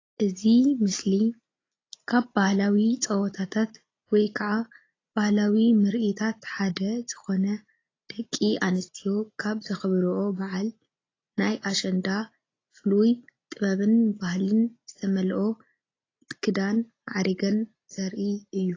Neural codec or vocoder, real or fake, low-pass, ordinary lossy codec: none; real; 7.2 kHz; AAC, 32 kbps